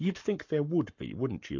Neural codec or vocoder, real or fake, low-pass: codec, 44.1 kHz, 7.8 kbps, Pupu-Codec; fake; 7.2 kHz